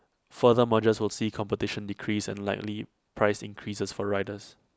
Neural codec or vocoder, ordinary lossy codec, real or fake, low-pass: none; none; real; none